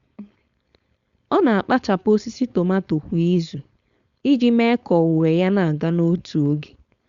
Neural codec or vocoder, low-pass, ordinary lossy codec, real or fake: codec, 16 kHz, 4.8 kbps, FACodec; 7.2 kHz; Opus, 64 kbps; fake